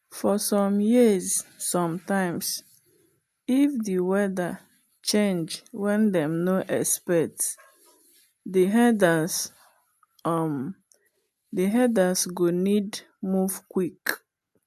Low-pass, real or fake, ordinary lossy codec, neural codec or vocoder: 14.4 kHz; real; AAC, 96 kbps; none